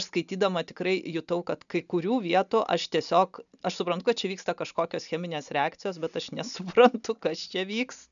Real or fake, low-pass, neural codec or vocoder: real; 7.2 kHz; none